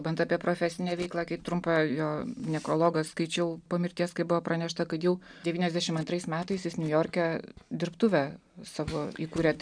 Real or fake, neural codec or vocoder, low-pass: real; none; 9.9 kHz